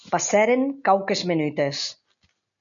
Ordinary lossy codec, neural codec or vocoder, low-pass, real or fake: MP3, 96 kbps; none; 7.2 kHz; real